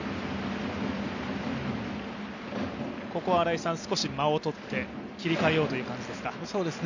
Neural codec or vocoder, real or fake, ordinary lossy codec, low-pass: none; real; none; 7.2 kHz